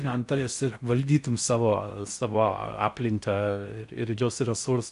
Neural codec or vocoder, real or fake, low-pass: codec, 16 kHz in and 24 kHz out, 0.6 kbps, FocalCodec, streaming, 4096 codes; fake; 10.8 kHz